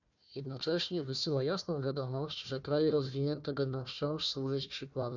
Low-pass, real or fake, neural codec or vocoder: 7.2 kHz; fake; codec, 16 kHz, 1 kbps, FunCodec, trained on Chinese and English, 50 frames a second